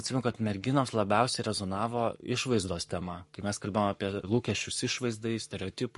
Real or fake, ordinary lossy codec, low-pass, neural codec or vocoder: fake; MP3, 48 kbps; 14.4 kHz; codec, 44.1 kHz, 7.8 kbps, Pupu-Codec